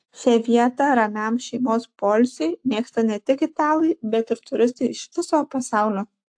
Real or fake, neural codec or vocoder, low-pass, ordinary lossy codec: fake; codec, 44.1 kHz, 7.8 kbps, Pupu-Codec; 9.9 kHz; AAC, 64 kbps